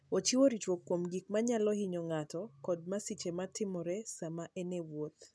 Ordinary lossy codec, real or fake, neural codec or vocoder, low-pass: none; real; none; none